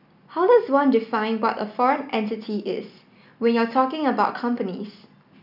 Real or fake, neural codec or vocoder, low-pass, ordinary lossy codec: real; none; 5.4 kHz; none